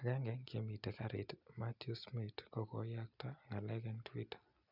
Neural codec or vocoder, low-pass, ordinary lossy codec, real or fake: none; 5.4 kHz; none; real